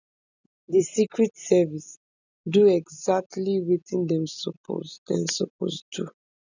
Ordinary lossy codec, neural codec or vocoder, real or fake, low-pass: none; none; real; 7.2 kHz